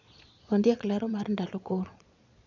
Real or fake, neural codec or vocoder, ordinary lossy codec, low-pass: fake; vocoder, 22.05 kHz, 80 mel bands, Vocos; none; 7.2 kHz